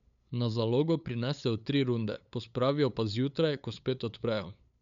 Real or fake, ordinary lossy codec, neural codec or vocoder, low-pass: fake; none; codec, 16 kHz, 8 kbps, FunCodec, trained on LibriTTS, 25 frames a second; 7.2 kHz